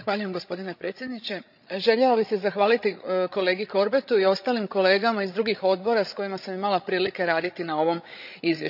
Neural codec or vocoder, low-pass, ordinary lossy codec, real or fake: codec, 16 kHz, 16 kbps, FreqCodec, larger model; 5.4 kHz; none; fake